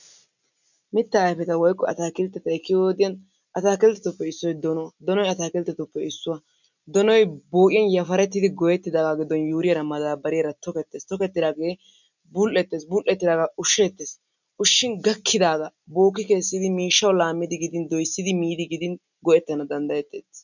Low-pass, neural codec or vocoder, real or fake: 7.2 kHz; none; real